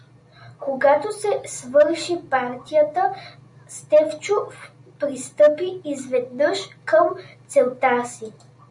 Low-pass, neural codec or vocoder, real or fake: 10.8 kHz; none; real